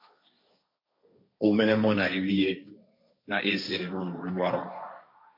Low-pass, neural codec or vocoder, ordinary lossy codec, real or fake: 5.4 kHz; codec, 16 kHz, 1.1 kbps, Voila-Tokenizer; MP3, 32 kbps; fake